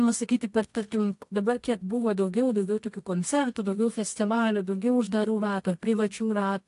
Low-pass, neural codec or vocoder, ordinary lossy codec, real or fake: 10.8 kHz; codec, 24 kHz, 0.9 kbps, WavTokenizer, medium music audio release; AAC, 64 kbps; fake